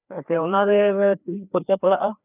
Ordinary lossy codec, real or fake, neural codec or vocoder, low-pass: none; fake; codec, 16 kHz, 2 kbps, FreqCodec, larger model; 3.6 kHz